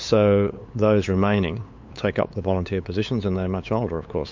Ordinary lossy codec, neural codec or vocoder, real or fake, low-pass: MP3, 48 kbps; codec, 16 kHz, 8 kbps, FunCodec, trained on LibriTTS, 25 frames a second; fake; 7.2 kHz